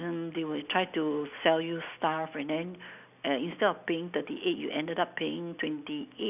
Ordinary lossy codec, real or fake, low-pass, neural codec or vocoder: none; real; 3.6 kHz; none